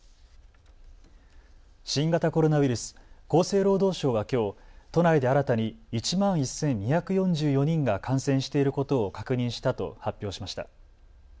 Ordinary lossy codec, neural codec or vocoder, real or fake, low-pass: none; none; real; none